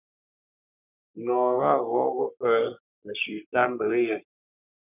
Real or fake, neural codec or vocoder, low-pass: fake; codec, 44.1 kHz, 3.4 kbps, Pupu-Codec; 3.6 kHz